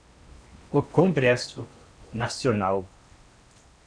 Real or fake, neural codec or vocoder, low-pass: fake; codec, 16 kHz in and 24 kHz out, 0.8 kbps, FocalCodec, streaming, 65536 codes; 9.9 kHz